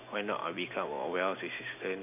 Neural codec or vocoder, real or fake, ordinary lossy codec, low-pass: none; real; none; 3.6 kHz